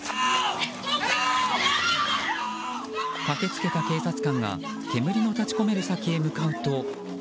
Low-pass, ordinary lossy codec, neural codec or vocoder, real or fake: none; none; none; real